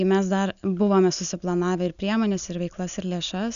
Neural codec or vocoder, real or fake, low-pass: none; real; 7.2 kHz